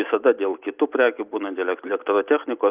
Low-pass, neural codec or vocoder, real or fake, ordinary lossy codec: 3.6 kHz; none; real; Opus, 32 kbps